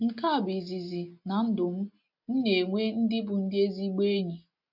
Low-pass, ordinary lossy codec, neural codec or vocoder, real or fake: 5.4 kHz; none; none; real